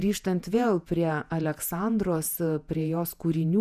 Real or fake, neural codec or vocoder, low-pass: fake; vocoder, 48 kHz, 128 mel bands, Vocos; 14.4 kHz